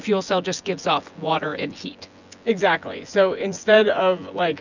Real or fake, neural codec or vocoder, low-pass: fake; vocoder, 24 kHz, 100 mel bands, Vocos; 7.2 kHz